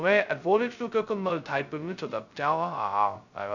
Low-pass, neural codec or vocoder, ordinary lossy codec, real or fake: 7.2 kHz; codec, 16 kHz, 0.2 kbps, FocalCodec; none; fake